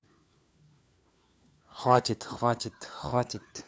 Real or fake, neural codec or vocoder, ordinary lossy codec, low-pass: fake; codec, 16 kHz, 4 kbps, FunCodec, trained on LibriTTS, 50 frames a second; none; none